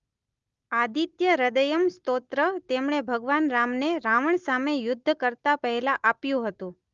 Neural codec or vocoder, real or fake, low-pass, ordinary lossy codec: none; real; 7.2 kHz; Opus, 32 kbps